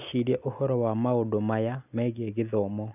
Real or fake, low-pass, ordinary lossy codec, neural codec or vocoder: real; 3.6 kHz; none; none